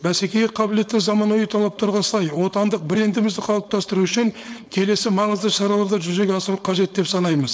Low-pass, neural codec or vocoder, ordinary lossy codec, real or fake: none; codec, 16 kHz, 4.8 kbps, FACodec; none; fake